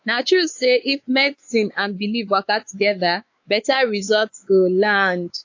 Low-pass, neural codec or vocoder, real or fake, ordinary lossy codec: 7.2 kHz; codec, 16 kHz, 4 kbps, X-Codec, WavLM features, trained on Multilingual LibriSpeech; fake; AAC, 48 kbps